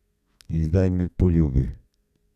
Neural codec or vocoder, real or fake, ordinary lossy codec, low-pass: codec, 32 kHz, 1.9 kbps, SNAC; fake; none; 14.4 kHz